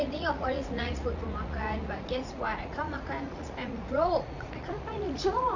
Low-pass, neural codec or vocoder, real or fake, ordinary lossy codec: 7.2 kHz; vocoder, 44.1 kHz, 80 mel bands, Vocos; fake; none